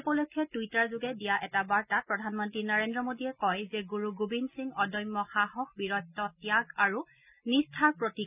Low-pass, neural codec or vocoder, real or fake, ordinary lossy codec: 3.6 kHz; none; real; none